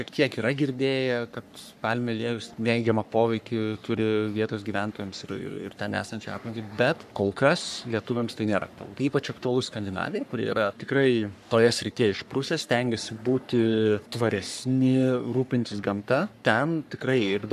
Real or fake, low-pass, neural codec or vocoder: fake; 14.4 kHz; codec, 44.1 kHz, 3.4 kbps, Pupu-Codec